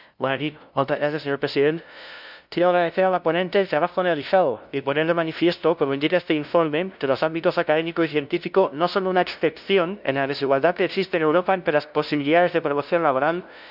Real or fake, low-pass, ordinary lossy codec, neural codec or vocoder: fake; 5.4 kHz; none; codec, 16 kHz, 0.5 kbps, FunCodec, trained on LibriTTS, 25 frames a second